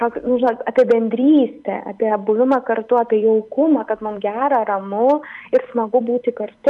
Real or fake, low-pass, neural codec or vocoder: real; 10.8 kHz; none